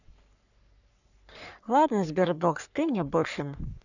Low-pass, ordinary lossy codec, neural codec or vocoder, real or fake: 7.2 kHz; none; codec, 44.1 kHz, 3.4 kbps, Pupu-Codec; fake